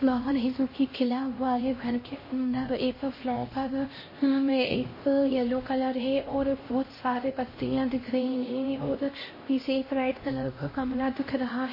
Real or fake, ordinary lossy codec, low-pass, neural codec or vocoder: fake; MP3, 24 kbps; 5.4 kHz; codec, 16 kHz, 1 kbps, X-Codec, HuBERT features, trained on LibriSpeech